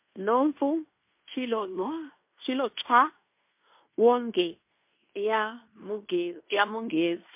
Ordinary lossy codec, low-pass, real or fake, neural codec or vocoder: MP3, 32 kbps; 3.6 kHz; fake; codec, 16 kHz in and 24 kHz out, 0.9 kbps, LongCat-Audio-Codec, fine tuned four codebook decoder